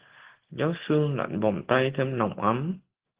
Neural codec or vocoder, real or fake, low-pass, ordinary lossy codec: none; real; 3.6 kHz; Opus, 16 kbps